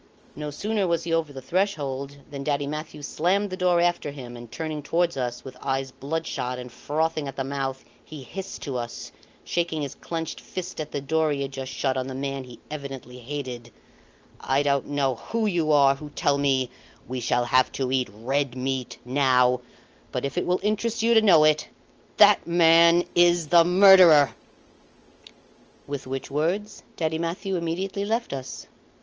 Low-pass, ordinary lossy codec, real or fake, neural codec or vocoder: 7.2 kHz; Opus, 24 kbps; real; none